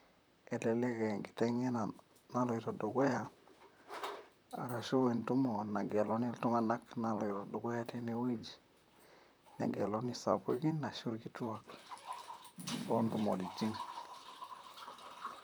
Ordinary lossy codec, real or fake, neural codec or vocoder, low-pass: none; fake; vocoder, 44.1 kHz, 128 mel bands, Pupu-Vocoder; none